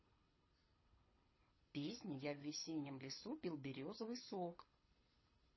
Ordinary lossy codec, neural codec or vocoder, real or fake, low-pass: MP3, 24 kbps; codec, 24 kHz, 6 kbps, HILCodec; fake; 7.2 kHz